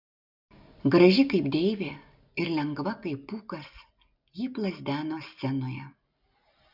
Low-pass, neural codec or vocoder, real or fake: 5.4 kHz; none; real